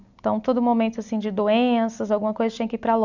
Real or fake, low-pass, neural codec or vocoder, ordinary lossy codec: real; 7.2 kHz; none; none